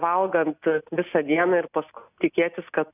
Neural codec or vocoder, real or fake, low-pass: none; real; 3.6 kHz